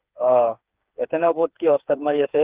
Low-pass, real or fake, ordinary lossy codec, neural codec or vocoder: 3.6 kHz; fake; Opus, 24 kbps; codec, 16 kHz, 4 kbps, FreqCodec, smaller model